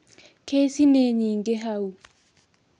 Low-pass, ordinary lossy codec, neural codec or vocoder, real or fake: 9.9 kHz; none; none; real